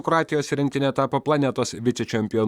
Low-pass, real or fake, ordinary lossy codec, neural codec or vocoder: 19.8 kHz; fake; Opus, 64 kbps; vocoder, 44.1 kHz, 128 mel bands, Pupu-Vocoder